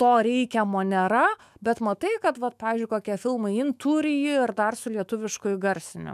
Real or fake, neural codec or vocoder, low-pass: fake; autoencoder, 48 kHz, 128 numbers a frame, DAC-VAE, trained on Japanese speech; 14.4 kHz